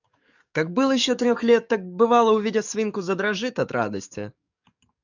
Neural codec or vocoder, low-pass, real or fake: codec, 44.1 kHz, 7.8 kbps, DAC; 7.2 kHz; fake